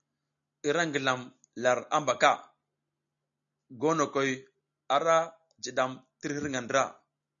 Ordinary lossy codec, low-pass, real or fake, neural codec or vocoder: MP3, 96 kbps; 7.2 kHz; real; none